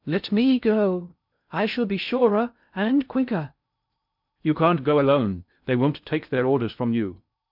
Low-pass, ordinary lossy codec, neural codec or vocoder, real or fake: 5.4 kHz; MP3, 48 kbps; codec, 16 kHz in and 24 kHz out, 0.6 kbps, FocalCodec, streaming, 2048 codes; fake